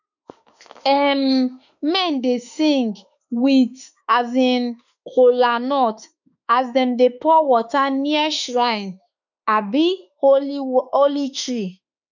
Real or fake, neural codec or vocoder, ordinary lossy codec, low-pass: fake; autoencoder, 48 kHz, 32 numbers a frame, DAC-VAE, trained on Japanese speech; none; 7.2 kHz